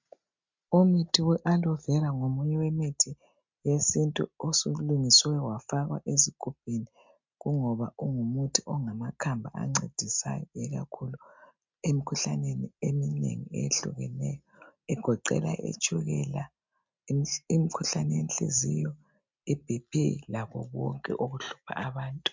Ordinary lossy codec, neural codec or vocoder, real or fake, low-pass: MP3, 64 kbps; none; real; 7.2 kHz